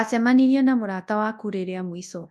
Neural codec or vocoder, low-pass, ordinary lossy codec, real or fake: codec, 24 kHz, 0.9 kbps, WavTokenizer, large speech release; none; none; fake